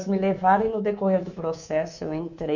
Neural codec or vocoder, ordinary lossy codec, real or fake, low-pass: codec, 24 kHz, 3.1 kbps, DualCodec; none; fake; 7.2 kHz